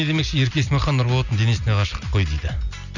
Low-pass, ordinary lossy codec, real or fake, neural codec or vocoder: 7.2 kHz; none; real; none